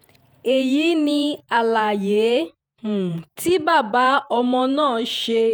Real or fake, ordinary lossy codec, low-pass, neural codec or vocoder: fake; none; none; vocoder, 48 kHz, 128 mel bands, Vocos